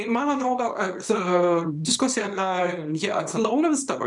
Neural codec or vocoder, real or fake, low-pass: codec, 24 kHz, 0.9 kbps, WavTokenizer, small release; fake; 10.8 kHz